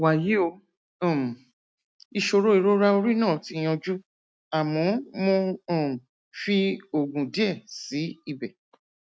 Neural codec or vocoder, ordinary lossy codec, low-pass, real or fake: none; none; none; real